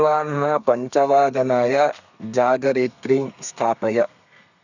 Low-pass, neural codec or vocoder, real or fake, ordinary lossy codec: 7.2 kHz; codec, 32 kHz, 1.9 kbps, SNAC; fake; none